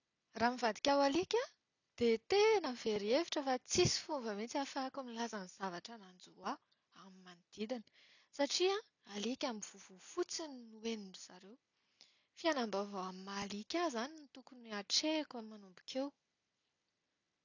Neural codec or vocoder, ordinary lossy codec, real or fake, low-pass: none; none; real; 7.2 kHz